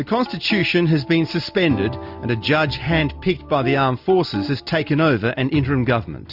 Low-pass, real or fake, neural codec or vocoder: 5.4 kHz; real; none